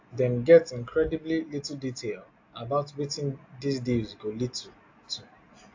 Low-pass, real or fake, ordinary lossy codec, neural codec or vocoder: 7.2 kHz; real; AAC, 48 kbps; none